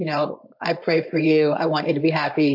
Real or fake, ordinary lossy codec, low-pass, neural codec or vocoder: fake; MP3, 32 kbps; 5.4 kHz; codec, 16 kHz, 4.8 kbps, FACodec